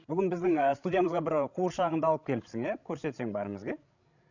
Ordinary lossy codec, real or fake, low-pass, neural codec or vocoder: none; fake; 7.2 kHz; codec, 16 kHz, 16 kbps, FreqCodec, larger model